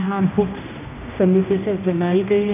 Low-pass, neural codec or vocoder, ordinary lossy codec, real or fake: 3.6 kHz; codec, 16 kHz, 1 kbps, X-Codec, HuBERT features, trained on general audio; none; fake